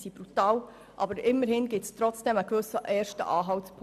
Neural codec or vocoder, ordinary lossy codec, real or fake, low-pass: none; none; real; 14.4 kHz